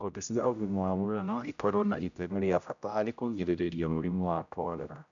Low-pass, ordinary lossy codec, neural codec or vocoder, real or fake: 7.2 kHz; none; codec, 16 kHz, 0.5 kbps, X-Codec, HuBERT features, trained on general audio; fake